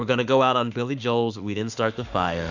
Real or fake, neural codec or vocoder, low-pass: fake; autoencoder, 48 kHz, 32 numbers a frame, DAC-VAE, trained on Japanese speech; 7.2 kHz